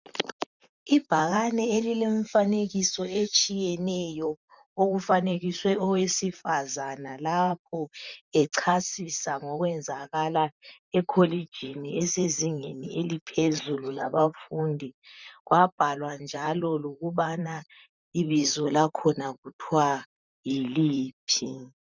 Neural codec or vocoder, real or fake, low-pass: vocoder, 44.1 kHz, 128 mel bands, Pupu-Vocoder; fake; 7.2 kHz